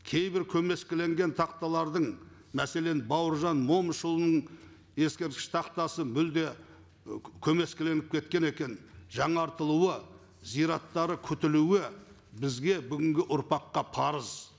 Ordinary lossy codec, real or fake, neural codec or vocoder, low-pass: none; real; none; none